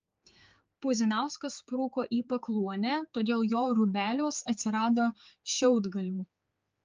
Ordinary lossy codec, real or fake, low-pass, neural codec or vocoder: Opus, 24 kbps; fake; 7.2 kHz; codec, 16 kHz, 4 kbps, X-Codec, HuBERT features, trained on general audio